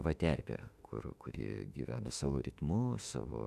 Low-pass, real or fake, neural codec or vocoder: 14.4 kHz; fake; autoencoder, 48 kHz, 32 numbers a frame, DAC-VAE, trained on Japanese speech